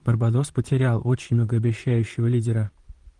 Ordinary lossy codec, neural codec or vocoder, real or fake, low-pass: Opus, 24 kbps; vocoder, 44.1 kHz, 128 mel bands, Pupu-Vocoder; fake; 10.8 kHz